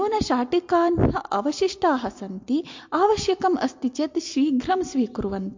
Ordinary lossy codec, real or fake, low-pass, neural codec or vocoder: MP3, 48 kbps; real; 7.2 kHz; none